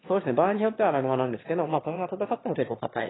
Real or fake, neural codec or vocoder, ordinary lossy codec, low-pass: fake; autoencoder, 22.05 kHz, a latent of 192 numbers a frame, VITS, trained on one speaker; AAC, 16 kbps; 7.2 kHz